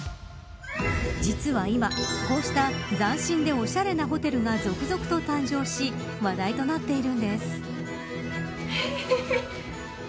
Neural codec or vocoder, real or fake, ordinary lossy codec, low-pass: none; real; none; none